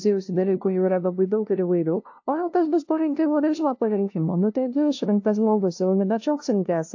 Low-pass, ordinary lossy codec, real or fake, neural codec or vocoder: 7.2 kHz; AAC, 48 kbps; fake; codec, 16 kHz, 0.5 kbps, FunCodec, trained on LibriTTS, 25 frames a second